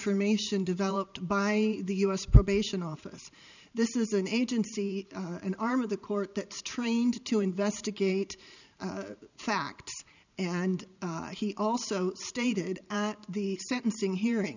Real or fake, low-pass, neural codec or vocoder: fake; 7.2 kHz; vocoder, 44.1 kHz, 128 mel bands every 512 samples, BigVGAN v2